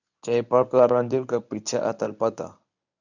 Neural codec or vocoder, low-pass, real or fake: codec, 24 kHz, 0.9 kbps, WavTokenizer, medium speech release version 1; 7.2 kHz; fake